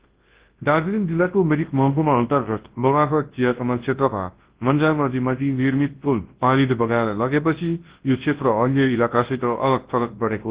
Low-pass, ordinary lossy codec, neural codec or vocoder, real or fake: 3.6 kHz; Opus, 16 kbps; codec, 24 kHz, 0.9 kbps, WavTokenizer, large speech release; fake